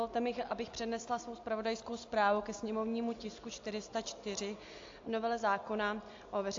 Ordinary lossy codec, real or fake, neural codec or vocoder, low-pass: AAC, 48 kbps; real; none; 7.2 kHz